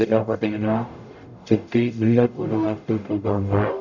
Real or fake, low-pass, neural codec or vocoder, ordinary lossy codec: fake; 7.2 kHz; codec, 44.1 kHz, 0.9 kbps, DAC; none